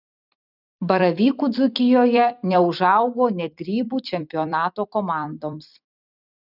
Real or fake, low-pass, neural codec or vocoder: fake; 5.4 kHz; vocoder, 44.1 kHz, 128 mel bands every 256 samples, BigVGAN v2